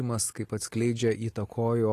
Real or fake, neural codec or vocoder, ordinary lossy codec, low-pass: real; none; AAC, 48 kbps; 14.4 kHz